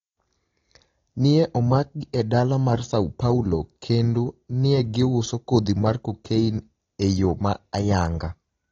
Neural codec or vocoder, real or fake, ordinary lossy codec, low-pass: none; real; AAC, 32 kbps; 7.2 kHz